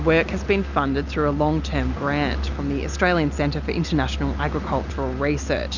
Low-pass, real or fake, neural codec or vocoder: 7.2 kHz; real; none